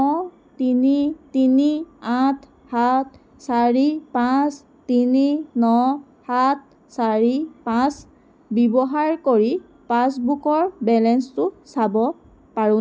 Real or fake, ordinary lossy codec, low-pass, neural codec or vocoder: real; none; none; none